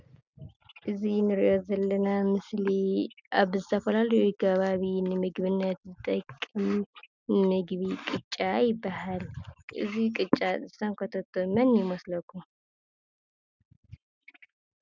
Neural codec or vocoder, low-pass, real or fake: none; 7.2 kHz; real